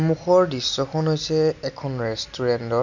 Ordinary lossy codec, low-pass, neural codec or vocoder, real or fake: none; 7.2 kHz; none; real